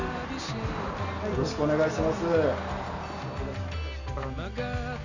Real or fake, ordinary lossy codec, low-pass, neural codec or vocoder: real; none; 7.2 kHz; none